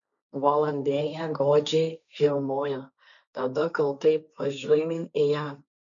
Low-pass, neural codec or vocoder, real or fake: 7.2 kHz; codec, 16 kHz, 1.1 kbps, Voila-Tokenizer; fake